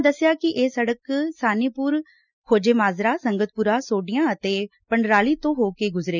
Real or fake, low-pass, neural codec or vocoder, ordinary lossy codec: real; 7.2 kHz; none; none